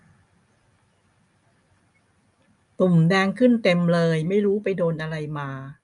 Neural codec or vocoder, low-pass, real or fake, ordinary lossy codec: none; 10.8 kHz; real; none